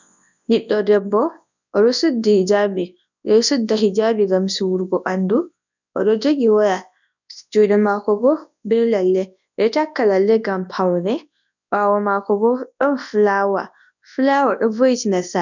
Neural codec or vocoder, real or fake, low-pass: codec, 24 kHz, 0.9 kbps, WavTokenizer, large speech release; fake; 7.2 kHz